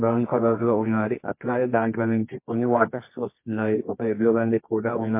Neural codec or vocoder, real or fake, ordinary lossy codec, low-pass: codec, 24 kHz, 0.9 kbps, WavTokenizer, medium music audio release; fake; MP3, 24 kbps; 3.6 kHz